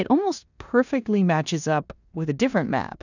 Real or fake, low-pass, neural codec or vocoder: fake; 7.2 kHz; codec, 16 kHz in and 24 kHz out, 0.9 kbps, LongCat-Audio-Codec, four codebook decoder